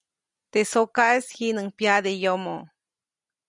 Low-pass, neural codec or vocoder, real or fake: 10.8 kHz; none; real